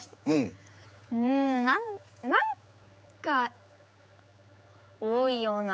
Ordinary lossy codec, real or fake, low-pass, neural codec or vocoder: none; fake; none; codec, 16 kHz, 4 kbps, X-Codec, HuBERT features, trained on general audio